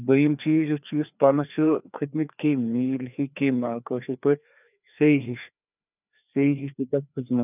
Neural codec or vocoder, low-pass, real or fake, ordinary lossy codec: codec, 16 kHz, 2 kbps, FreqCodec, larger model; 3.6 kHz; fake; none